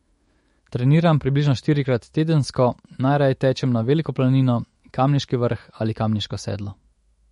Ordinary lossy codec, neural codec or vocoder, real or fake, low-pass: MP3, 48 kbps; autoencoder, 48 kHz, 128 numbers a frame, DAC-VAE, trained on Japanese speech; fake; 19.8 kHz